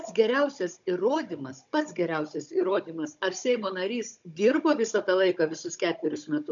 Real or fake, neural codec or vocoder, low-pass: fake; codec, 16 kHz, 16 kbps, FunCodec, trained on Chinese and English, 50 frames a second; 7.2 kHz